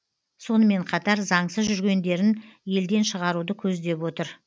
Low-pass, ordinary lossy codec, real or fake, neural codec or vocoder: none; none; real; none